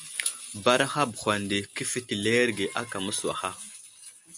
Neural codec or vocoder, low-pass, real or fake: none; 10.8 kHz; real